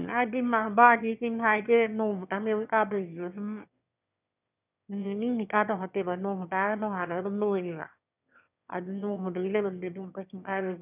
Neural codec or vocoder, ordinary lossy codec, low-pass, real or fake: autoencoder, 22.05 kHz, a latent of 192 numbers a frame, VITS, trained on one speaker; none; 3.6 kHz; fake